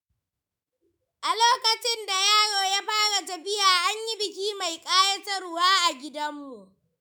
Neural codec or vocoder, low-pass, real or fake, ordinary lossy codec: autoencoder, 48 kHz, 128 numbers a frame, DAC-VAE, trained on Japanese speech; none; fake; none